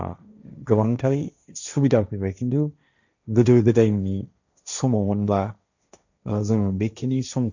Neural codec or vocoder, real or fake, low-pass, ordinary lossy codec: codec, 16 kHz, 1.1 kbps, Voila-Tokenizer; fake; 7.2 kHz; none